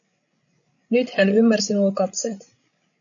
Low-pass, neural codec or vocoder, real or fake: 7.2 kHz; codec, 16 kHz, 16 kbps, FreqCodec, larger model; fake